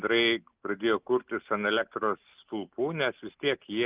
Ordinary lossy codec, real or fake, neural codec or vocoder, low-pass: Opus, 24 kbps; real; none; 3.6 kHz